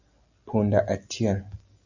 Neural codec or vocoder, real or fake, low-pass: none; real; 7.2 kHz